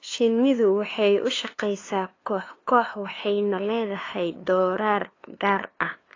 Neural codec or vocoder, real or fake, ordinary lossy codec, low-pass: codec, 16 kHz, 2 kbps, FunCodec, trained on LibriTTS, 25 frames a second; fake; AAC, 32 kbps; 7.2 kHz